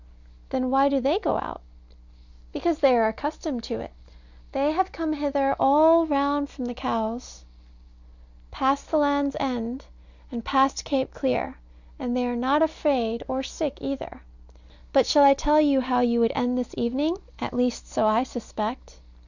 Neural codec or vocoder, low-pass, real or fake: none; 7.2 kHz; real